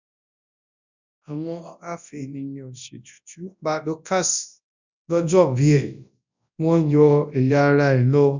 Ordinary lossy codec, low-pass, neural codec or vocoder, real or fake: none; 7.2 kHz; codec, 24 kHz, 0.9 kbps, WavTokenizer, large speech release; fake